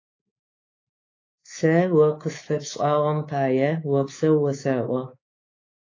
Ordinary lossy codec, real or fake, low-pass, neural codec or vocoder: AAC, 32 kbps; fake; 7.2 kHz; codec, 24 kHz, 3.1 kbps, DualCodec